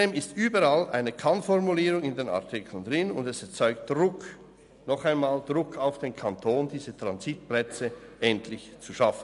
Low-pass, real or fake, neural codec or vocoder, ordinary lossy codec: 10.8 kHz; real; none; none